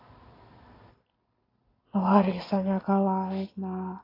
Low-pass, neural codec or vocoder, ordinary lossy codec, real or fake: 5.4 kHz; none; AAC, 24 kbps; real